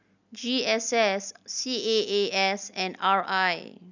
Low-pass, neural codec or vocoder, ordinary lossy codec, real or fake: 7.2 kHz; none; none; real